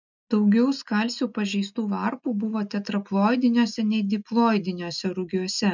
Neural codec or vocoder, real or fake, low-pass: none; real; 7.2 kHz